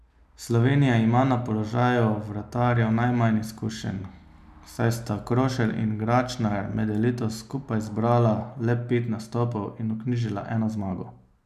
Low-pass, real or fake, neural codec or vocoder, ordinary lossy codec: 14.4 kHz; real; none; none